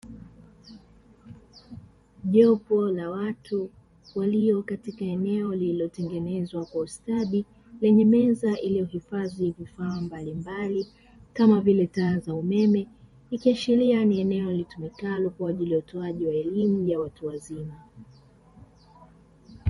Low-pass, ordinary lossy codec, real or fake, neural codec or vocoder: 19.8 kHz; MP3, 48 kbps; fake; vocoder, 44.1 kHz, 128 mel bands every 512 samples, BigVGAN v2